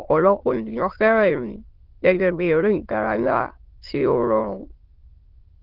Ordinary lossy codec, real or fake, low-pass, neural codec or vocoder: Opus, 16 kbps; fake; 5.4 kHz; autoencoder, 22.05 kHz, a latent of 192 numbers a frame, VITS, trained on many speakers